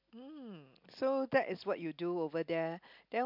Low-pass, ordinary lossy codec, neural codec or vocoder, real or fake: 5.4 kHz; MP3, 48 kbps; none; real